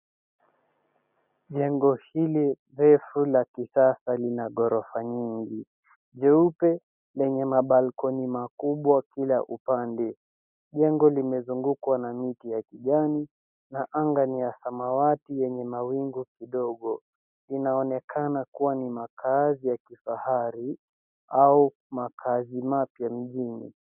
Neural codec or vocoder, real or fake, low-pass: none; real; 3.6 kHz